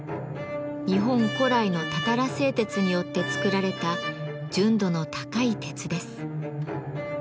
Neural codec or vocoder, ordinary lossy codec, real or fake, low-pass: none; none; real; none